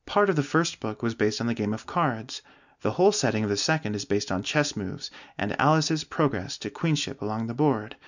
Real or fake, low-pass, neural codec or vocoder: real; 7.2 kHz; none